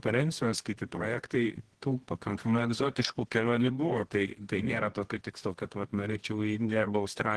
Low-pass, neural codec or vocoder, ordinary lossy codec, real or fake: 10.8 kHz; codec, 24 kHz, 0.9 kbps, WavTokenizer, medium music audio release; Opus, 16 kbps; fake